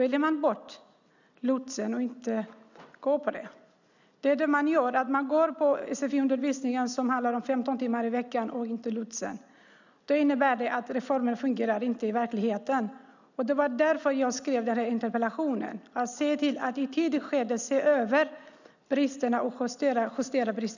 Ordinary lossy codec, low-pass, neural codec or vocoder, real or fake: none; 7.2 kHz; none; real